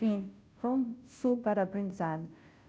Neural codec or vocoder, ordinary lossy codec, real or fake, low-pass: codec, 16 kHz, 0.5 kbps, FunCodec, trained on Chinese and English, 25 frames a second; none; fake; none